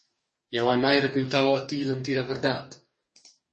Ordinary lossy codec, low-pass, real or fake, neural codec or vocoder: MP3, 32 kbps; 10.8 kHz; fake; codec, 44.1 kHz, 2.6 kbps, DAC